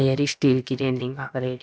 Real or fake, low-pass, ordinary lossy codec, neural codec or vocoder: fake; none; none; codec, 16 kHz, about 1 kbps, DyCAST, with the encoder's durations